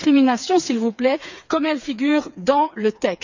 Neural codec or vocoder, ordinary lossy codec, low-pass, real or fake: codec, 16 kHz, 4 kbps, FreqCodec, smaller model; none; 7.2 kHz; fake